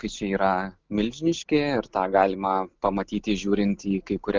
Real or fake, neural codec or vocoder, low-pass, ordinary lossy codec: real; none; 7.2 kHz; Opus, 32 kbps